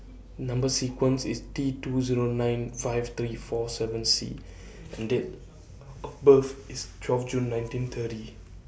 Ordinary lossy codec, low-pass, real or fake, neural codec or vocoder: none; none; real; none